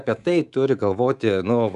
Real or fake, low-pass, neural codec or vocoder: fake; 10.8 kHz; codec, 24 kHz, 3.1 kbps, DualCodec